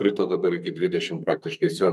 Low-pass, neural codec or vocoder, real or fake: 14.4 kHz; codec, 32 kHz, 1.9 kbps, SNAC; fake